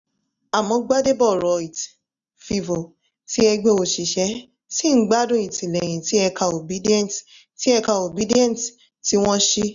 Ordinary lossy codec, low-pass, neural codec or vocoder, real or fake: none; 7.2 kHz; none; real